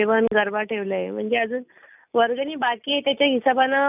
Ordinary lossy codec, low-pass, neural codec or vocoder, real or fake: none; 3.6 kHz; none; real